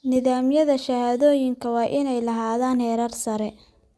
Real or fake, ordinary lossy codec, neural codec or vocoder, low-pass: real; none; none; none